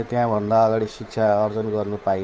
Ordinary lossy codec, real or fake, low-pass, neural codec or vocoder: none; fake; none; codec, 16 kHz, 8 kbps, FunCodec, trained on Chinese and English, 25 frames a second